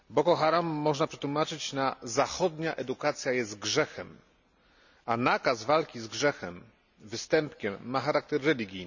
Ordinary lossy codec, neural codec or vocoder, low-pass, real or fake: none; none; 7.2 kHz; real